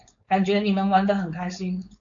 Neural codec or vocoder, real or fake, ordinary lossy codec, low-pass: codec, 16 kHz, 4.8 kbps, FACodec; fake; AAC, 64 kbps; 7.2 kHz